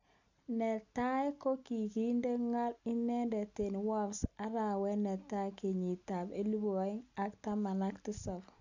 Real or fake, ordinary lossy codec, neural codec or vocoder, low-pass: real; none; none; 7.2 kHz